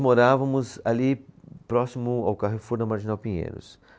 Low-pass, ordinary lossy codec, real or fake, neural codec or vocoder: none; none; real; none